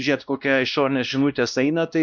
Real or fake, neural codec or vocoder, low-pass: fake; codec, 16 kHz, 1 kbps, X-Codec, WavLM features, trained on Multilingual LibriSpeech; 7.2 kHz